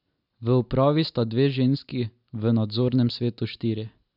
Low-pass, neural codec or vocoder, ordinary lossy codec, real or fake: 5.4 kHz; none; none; real